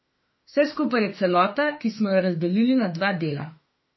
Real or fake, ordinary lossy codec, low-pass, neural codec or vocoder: fake; MP3, 24 kbps; 7.2 kHz; autoencoder, 48 kHz, 32 numbers a frame, DAC-VAE, trained on Japanese speech